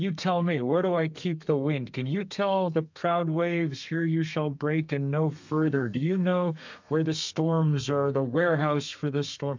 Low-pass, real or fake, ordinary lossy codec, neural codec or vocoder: 7.2 kHz; fake; MP3, 64 kbps; codec, 32 kHz, 1.9 kbps, SNAC